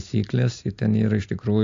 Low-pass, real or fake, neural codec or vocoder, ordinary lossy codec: 7.2 kHz; real; none; AAC, 48 kbps